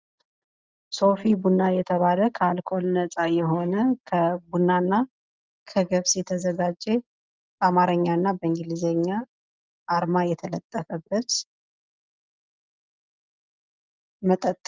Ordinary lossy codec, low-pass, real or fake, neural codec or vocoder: Opus, 32 kbps; 7.2 kHz; real; none